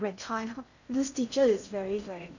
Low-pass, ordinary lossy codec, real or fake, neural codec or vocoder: 7.2 kHz; none; fake; codec, 16 kHz in and 24 kHz out, 0.6 kbps, FocalCodec, streaming, 2048 codes